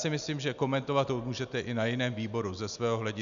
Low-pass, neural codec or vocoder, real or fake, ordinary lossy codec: 7.2 kHz; none; real; MP3, 96 kbps